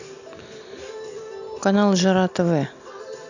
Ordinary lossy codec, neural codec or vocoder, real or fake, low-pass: none; none; real; 7.2 kHz